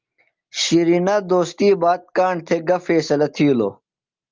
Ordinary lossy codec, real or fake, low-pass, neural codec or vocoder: Opus, 32 kbps; real; 7.2 kHz; none